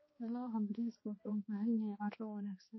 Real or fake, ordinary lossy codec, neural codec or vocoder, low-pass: fake; MP3, 24 kbps; codec, 16 kHz, 2 kbps, X-Codec, HuBERT features, trained on balanced general audio; 7.2 kHz